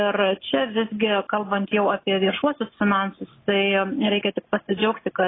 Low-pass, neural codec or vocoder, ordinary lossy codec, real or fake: 7.2 kHz; none; AAC, 16 kbps; real